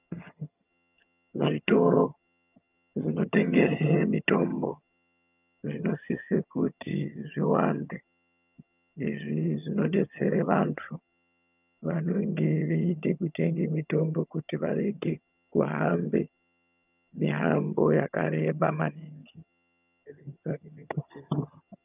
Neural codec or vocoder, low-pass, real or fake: vocoder, 22.05 kHz, 80 mel bands, HiFi-GAN; 3.6 kHz; fake